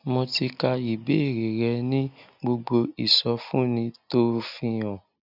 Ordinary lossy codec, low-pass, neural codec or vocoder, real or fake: none; 5.4 kHz; none; real